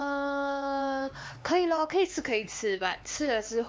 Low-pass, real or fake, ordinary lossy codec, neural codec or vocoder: none; fake; none; codec, 16 kHz, 4 kbps, X-Codec, HuBERT features, trained on LibriSpeech